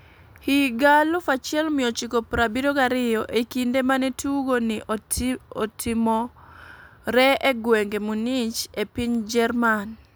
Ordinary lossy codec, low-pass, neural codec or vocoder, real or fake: none; none; none; real